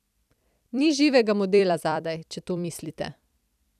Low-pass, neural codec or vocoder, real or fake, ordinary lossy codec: 14.4 kHz; vocoder, 44.1 kHz, 128 mel bands every 256 samples, BigVGAN v2; fake; none